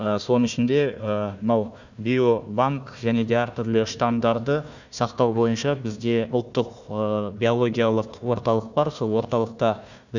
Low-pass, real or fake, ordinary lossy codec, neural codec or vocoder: 7.2 kHz; fake; none; codec, 16 kHz, 1 kbps, FunCodec, trained on Chinese and English, 50 frames a second